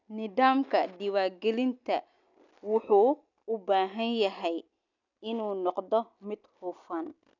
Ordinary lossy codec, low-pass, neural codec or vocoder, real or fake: none; 7.2 kHz; none; real